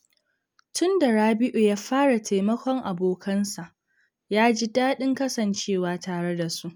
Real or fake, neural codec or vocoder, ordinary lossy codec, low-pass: real; none; none; none